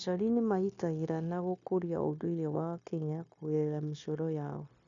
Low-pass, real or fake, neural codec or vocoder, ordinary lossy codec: 7.2 kHz; fake; codec, 16 kHz, 0.9 kbps, LongCat-Audio-Codec; none